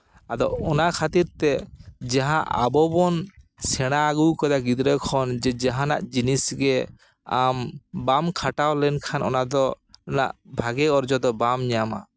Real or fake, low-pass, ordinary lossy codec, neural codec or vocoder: real; none; none; none